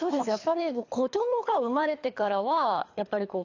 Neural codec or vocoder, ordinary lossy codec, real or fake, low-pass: codec, 24 kHz, 3 kbps, HILCodec; AAC, 48 kbps; fake; 7.2 kHz